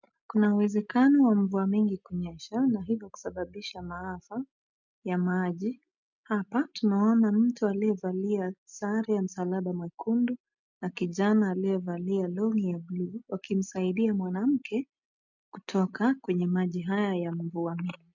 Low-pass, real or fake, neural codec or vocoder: 7.2 kHz; real; none